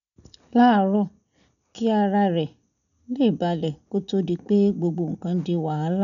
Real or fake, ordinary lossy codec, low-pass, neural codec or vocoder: real; none; 7.2 kHz; none